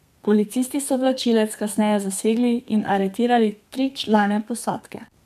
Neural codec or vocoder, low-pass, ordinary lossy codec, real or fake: codec, 32 kHz, 1.9 kbps, SNAC; 14.4 kHz; MP3, 96 kbps; fake